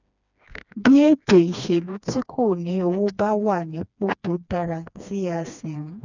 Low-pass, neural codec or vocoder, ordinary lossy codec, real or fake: 7.2 kHz; codec, 16 kHz, 2 kbps, FreqCodec, smaller model; none; fake